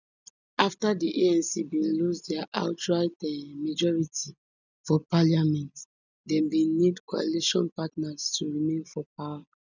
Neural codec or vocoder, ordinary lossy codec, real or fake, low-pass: none; none; real; 7.2 kHz